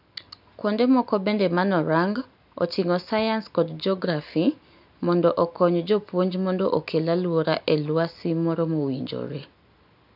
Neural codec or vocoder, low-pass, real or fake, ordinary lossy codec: none; 5.4 kHz; real; none